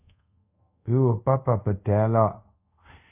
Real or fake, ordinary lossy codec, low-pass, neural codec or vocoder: fake; AAC, 32 kbps; 3.6 kHz; codec, 24 kHz, 0.5 kbps, DualCodec